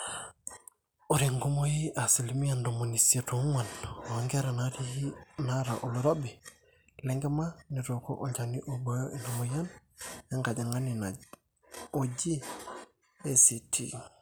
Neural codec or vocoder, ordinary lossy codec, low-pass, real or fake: none; none; none; real